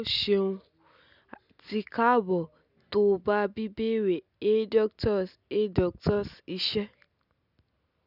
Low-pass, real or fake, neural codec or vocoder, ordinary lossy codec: 5.4 kHz; real; none; none